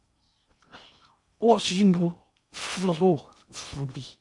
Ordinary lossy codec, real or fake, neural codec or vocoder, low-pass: MP3, 64 kbps; fake; codec, 16 kHz in and 24 kHz out, 0.6 kbps, FocalCodec, streaming, 2048 codes; 10.8 kHz